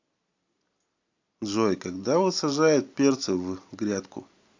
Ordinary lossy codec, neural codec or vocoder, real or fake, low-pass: AAC, 48 kbps; none; real; 7.2 kHz